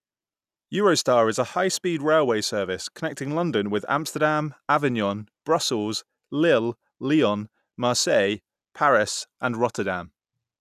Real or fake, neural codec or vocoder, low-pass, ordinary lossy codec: real; none; 14.4 kHz; none